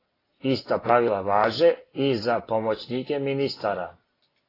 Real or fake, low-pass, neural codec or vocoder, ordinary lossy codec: real; 5.4 kHz; none; AAC, 24 kbps